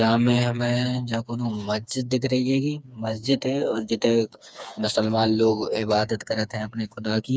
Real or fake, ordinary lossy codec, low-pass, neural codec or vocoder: fake; none; none; codec, 16 kHz, 4 kbps, FreqCodec, smaller model